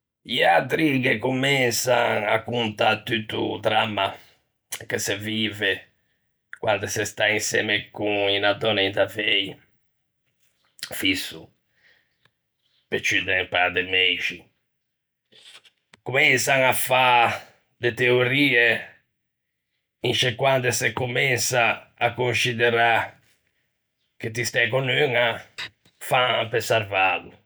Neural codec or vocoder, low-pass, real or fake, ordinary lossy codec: none; none; real; none